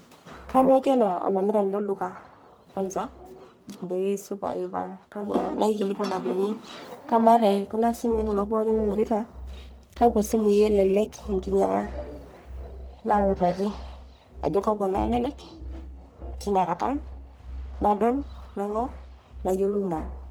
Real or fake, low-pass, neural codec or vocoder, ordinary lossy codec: fake; none; codec, 44.1 kHz, 1.7 kbps, Pupu-Codec; none